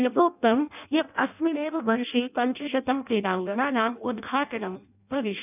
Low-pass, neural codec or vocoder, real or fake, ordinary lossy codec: 3.6 kHz; codec, 16 kHz in and 24 kHz out, 0.6 kbps, FireRedTTS-2 codec; fake; none